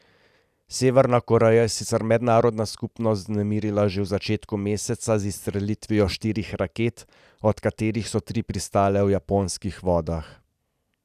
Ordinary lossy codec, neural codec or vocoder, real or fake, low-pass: none; none; real; 14.4 kHz